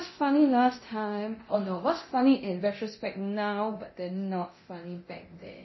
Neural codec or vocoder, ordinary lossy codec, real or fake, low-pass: codec, 24 kHz, 0.9 kbps, DualCodec; MP3, 24 kbps; fake; 7.2 kHz